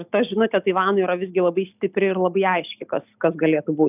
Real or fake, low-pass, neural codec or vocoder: real; 3.6 kHz; none